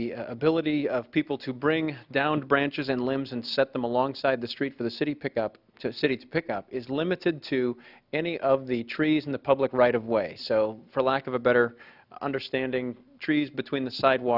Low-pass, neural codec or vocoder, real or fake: 5.4 kHz; none; real